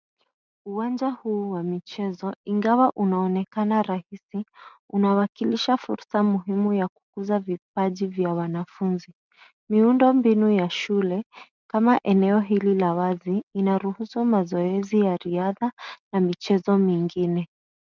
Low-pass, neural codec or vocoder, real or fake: 7.2 kHz; none; real